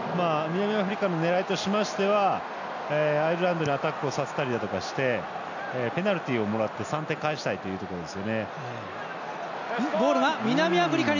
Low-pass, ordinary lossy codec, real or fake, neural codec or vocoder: 7.2 kHz; none; real; none